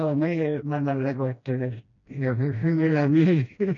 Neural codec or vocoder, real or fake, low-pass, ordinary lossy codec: codec, 16 kHz, 1 kbps, FreqCodec, smaller model; fake; 7.2 kHz; none